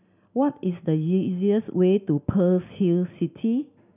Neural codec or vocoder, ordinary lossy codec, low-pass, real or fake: none; none; 3.6 kHz; real